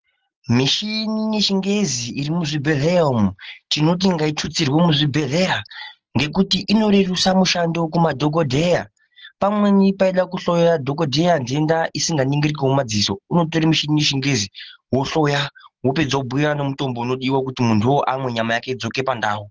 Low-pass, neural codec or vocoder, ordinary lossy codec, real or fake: 7.2 kHz; none; Opus, 16 kbps; real